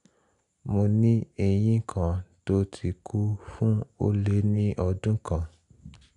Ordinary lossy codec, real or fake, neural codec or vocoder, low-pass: none; real; none; 10.8 kHz